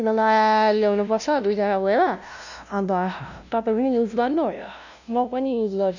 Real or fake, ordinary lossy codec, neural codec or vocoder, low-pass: fake; none; codec, 16 kHz, 0.5 kbps, FunCodec, trained on LibriTTS, 25 frames a second; 7.2 kHz